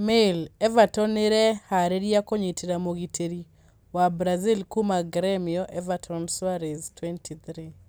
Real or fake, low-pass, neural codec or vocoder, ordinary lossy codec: real; none; none; none